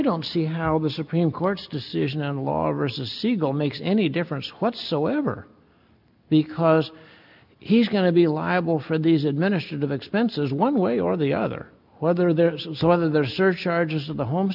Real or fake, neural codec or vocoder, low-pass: real; none; 5.4 kHz